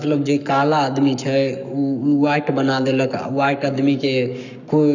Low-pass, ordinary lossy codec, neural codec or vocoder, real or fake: 7.2 kHz; none; codec, 44.1 kHz, 7.8 kbps, Pupu-Codec; fake